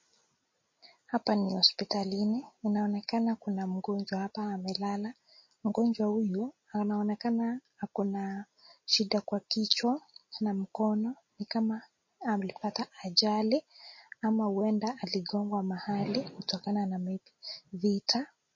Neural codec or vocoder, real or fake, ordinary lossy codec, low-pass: none; real; MP3, 32 kbps; 7.2 kHz